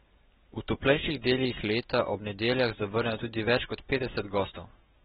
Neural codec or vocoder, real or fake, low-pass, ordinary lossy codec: none; real; 19.8 kHz; AAC, 16 kbps